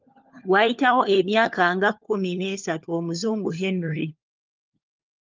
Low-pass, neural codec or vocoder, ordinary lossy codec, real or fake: 7.2 kHz; codec, 16 kHz, 4 kbps, FunCodec, trained on LibriTTS, 50 frames a second; Opus, 24 kbps; fake